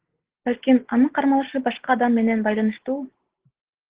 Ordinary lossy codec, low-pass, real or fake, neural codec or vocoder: Opus, 16 kbps; 3.6 kHz; real; none